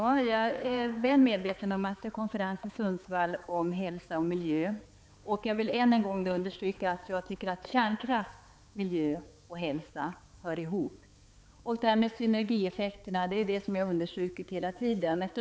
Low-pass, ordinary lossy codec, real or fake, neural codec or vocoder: none; none; fake; codec, 16 kHz, 4 kbps, X-Codec, HuBERT features, trained on balanced general audio